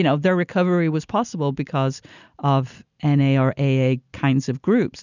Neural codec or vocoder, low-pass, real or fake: none; 7.2 kHz; real